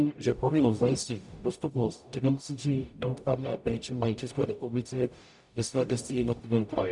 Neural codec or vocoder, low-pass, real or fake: codec, 44.1 kHz, 0.9 kbps, DAC; 10.8 kHz; fake